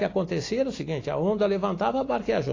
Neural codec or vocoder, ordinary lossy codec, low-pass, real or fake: none; AAC, 32 kbps; 7.2 kHz; real